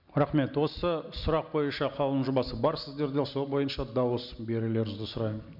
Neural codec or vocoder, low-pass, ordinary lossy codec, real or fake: none; 5.4 kHz; MP3, 48 kbps; real